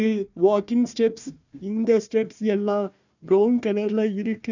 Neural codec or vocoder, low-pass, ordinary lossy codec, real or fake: codec, 16 kHz, 1 kbps, FunCodec, trained on Chinese and English, 50 frames a second; 7.2 kHz; none; fake